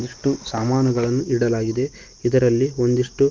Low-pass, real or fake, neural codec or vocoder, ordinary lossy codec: 7.2 kHz; real; none; Opus, 32 kbps